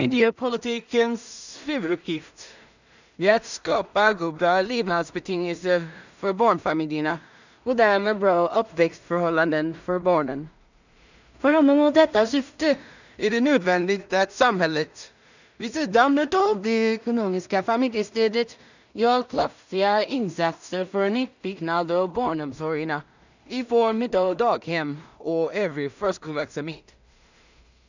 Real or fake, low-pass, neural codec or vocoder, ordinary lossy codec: fake; 7.2 kHz; codec, 16 kHz in and 24 kHz out, 0.4 kbps, LongCat-Audio-Codec, two codebook decoder; none